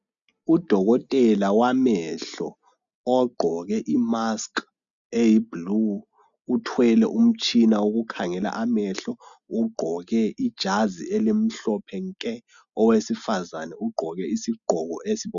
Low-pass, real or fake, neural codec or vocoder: 7.2 kHz; real; none